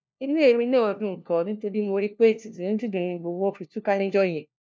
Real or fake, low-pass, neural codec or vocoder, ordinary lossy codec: fake; none; codec, 16 kHz, 1 kbps, FunCodec, trained on LibriTTS, 50 frames a second; none